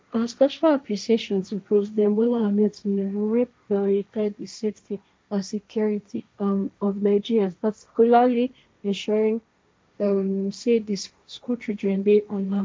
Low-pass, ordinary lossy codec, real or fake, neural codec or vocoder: none; none; fake; codec, 16 kHz, 1.1 kbps, Voila-Tokenizer